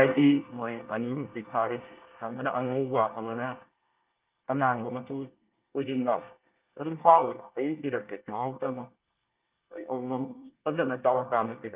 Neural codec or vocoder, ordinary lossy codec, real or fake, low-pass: codec, 24 kHz, 1 kbps, SNAC; Opus, 24 kbps; fake; 3.6 kHz